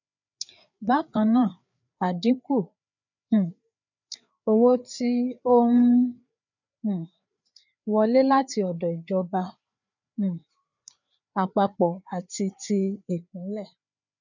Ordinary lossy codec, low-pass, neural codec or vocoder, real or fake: none; 7.2 kHz; codec, 16 kHz, 4 kbps, FreqCodec, larger model; fake